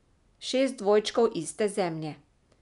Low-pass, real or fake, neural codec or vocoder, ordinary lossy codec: 10.8 kHz; real; none; none